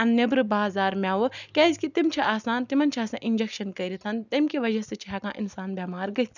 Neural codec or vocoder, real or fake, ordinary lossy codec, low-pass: none; real; none; 7.2 kHz